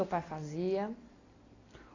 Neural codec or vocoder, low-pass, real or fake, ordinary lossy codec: none; 7.2 kHz; real; AAC, 32 kbps